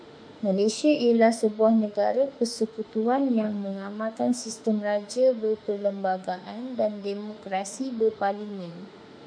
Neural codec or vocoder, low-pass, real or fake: autoencoder, 48 kHz, 32 numbers a frame, DAC-VAE, trained on Japanese speech; 9.9 kHz; fake